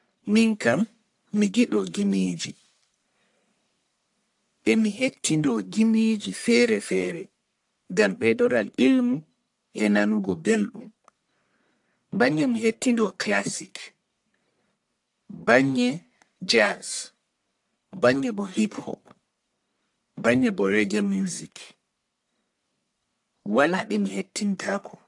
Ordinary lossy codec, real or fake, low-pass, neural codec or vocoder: AAC, 64 kbps; fake; 10.8 kHz; codec, 44.1 kHz, 1.7 kbps, Pupu-Codec